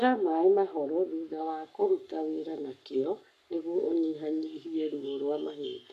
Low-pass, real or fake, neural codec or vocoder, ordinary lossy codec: 14.4 kHz; fake; codec, 44.1 kHz, 7.8 kbps, Pupu-Codec; none